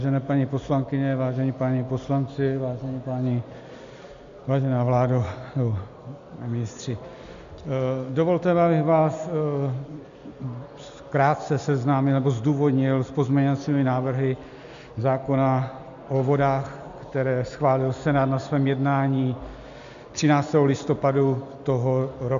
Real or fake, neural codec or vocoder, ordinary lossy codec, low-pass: real; none; MP3, 64 kbps; 7.2 kHz